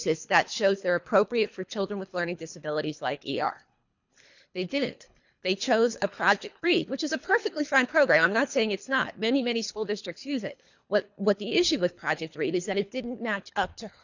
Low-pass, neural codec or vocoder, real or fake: 7.2 kHz; codec, 24 kHz, 3 kbps, HILCodec; fake